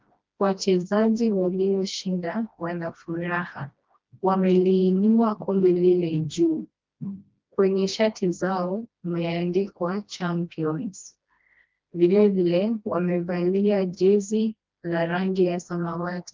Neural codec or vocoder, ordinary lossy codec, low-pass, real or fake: codec, 16 kHz, 1 kbps, FreqCodec, smaller model; Opus, 24 kbps; 7.2 kHz; fake